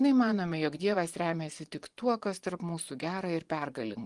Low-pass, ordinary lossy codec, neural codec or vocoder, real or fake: 10.8 kHz; Opus, 24 kbps; vocoder, 24 kHz, 100 mel bands, Vocos; fake